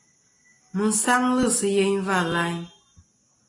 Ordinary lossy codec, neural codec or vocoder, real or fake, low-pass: AAC, 32 kbps; none; real; 10.8 kHz